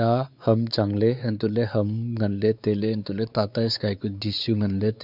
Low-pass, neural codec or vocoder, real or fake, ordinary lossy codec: 5.4 kHz; codec, 16 kHz, 4 kbps, X-Codec, WavLM features, trained on Multilingual LibriSpeech; fake; none